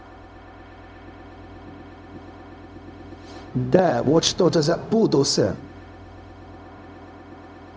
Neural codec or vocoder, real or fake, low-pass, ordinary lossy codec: codec, 16 kHz, 0.4 kbps, LongCat-Audio-Codec; fake; none; none